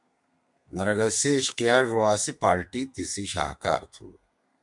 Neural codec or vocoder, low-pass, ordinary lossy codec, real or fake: codec, 32 kHz, 1.9 kbps, SNAC; 10.8 kHz; MP3, 96 kbps; fake